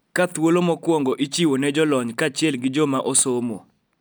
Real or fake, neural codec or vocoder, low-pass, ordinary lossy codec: real; none; none; none